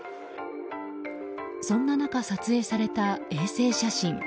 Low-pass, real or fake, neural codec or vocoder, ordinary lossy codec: none; real; none; none